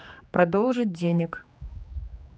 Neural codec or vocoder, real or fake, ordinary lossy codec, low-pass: codec, 16 kHz, 2 kbps, X-Codec, HuBERT features, trained on general audio; fake; none; none